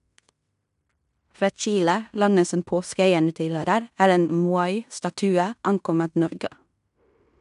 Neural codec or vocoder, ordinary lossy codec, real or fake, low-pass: codec, 16 kHz in and 24 kHz out, 0.9 kbps, LongCat-Audio-Codec, fine tuned four codebook decoder; none; fake; 10.8 kHz